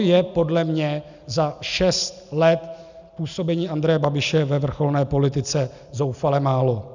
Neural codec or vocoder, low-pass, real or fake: none; 7.2 kHz; real